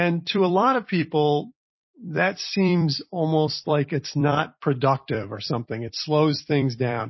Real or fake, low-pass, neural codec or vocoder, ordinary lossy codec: fake; 7.2 kHz; vocoder, 44.1 kHz, 128 mel bands every 256 samples, BigVGAN v2; MP3, 24 kbps